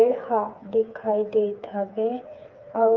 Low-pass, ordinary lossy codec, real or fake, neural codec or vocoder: 7.2 kHz; Opus, 24 kbps; fake; codec, 16 kHz, 4 kbps, FreqCodec, smaller model